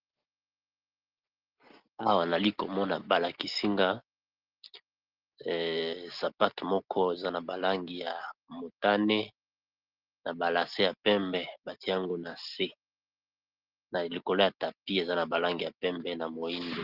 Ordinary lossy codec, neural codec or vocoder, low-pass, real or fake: Opus, 16 kbps; none; 5.4 kHz; real